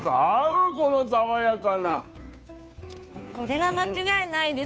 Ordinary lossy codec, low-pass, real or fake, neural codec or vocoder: none; none; fake; codec, 16 kHz, 2 kbps, FunCodec, trained on Chinese and English, 25 frames a second